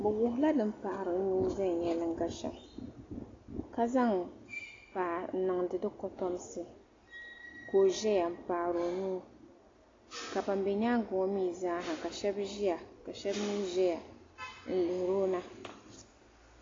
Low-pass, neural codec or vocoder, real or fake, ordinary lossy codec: 7.2 kHz; none; real; AAC, 32 kbps